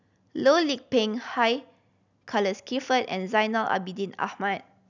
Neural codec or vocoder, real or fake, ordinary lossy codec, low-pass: none; real; none; 7.2 kHz